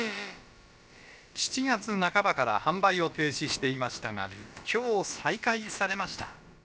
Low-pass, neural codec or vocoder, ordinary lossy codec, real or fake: none; codec, 16 kHz, about 1 kbps, DyCAST, with the encoder's durations; none; fake